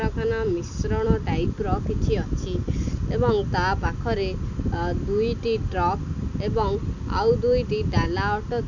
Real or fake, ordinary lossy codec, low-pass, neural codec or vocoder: real; none; 7.2 kHz; none